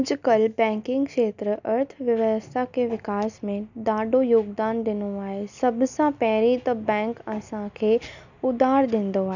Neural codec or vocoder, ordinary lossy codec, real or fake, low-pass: none; none; real; 7.2 kHz